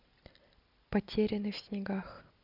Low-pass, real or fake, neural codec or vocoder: 5.4 kHz; real; none